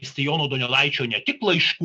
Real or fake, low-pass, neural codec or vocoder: real; 9.9 kHz; none